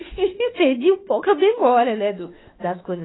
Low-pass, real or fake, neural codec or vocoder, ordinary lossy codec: 7.2 kHz; fake; autoencoder, 48 kHz, 32 numbers a frame, DAC-VAE, trained on Japanese speech; AAC, 16 kbps